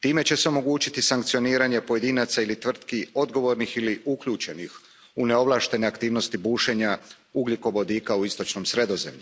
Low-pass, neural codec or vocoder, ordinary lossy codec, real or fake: none; none; none; real